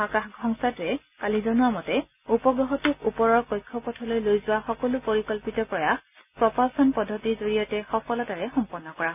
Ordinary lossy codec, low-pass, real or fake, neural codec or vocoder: none; 3.6 kHz; real; none